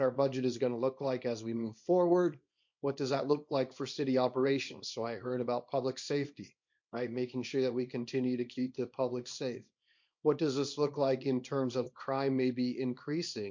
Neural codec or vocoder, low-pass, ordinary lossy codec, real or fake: codec, 24 kHz, 0.9 kbps, WavTokenizer, small release; 7.2 kHz; MP3, 48 kbps; fake